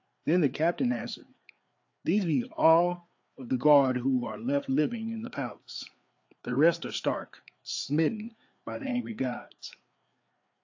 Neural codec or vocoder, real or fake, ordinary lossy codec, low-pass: codec, 16 kHz, 4 kbps, FreqCodec, larger model; fake; AAC, 48 kbps; 7.2 kHz